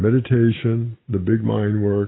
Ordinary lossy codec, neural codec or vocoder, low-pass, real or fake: AAC, 16 kbps; none; 7.2 kHz; real